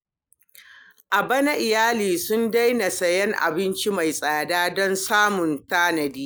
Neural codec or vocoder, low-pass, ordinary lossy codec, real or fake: none; none; none; real